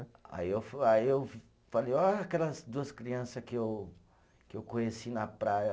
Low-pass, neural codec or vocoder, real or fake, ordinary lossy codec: none; none; real; none